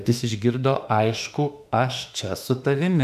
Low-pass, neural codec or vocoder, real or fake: 14.4 kHz; autoencoder, 48 kHz, 32 numbers a frame, DAC-VAE, trained on Japanese speech; fake